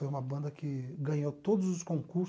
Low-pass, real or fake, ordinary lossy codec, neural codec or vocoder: none; real; none; none